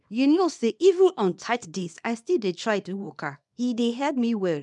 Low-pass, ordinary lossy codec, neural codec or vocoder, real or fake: 10.8 kHz; none; codec, 24 kHz, 0.9 kbps, WavTokenizer, small release; fake